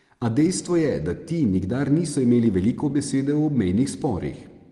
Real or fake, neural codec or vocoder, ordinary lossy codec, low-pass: real; none; Opus, 24 kbps; 10.8 kHz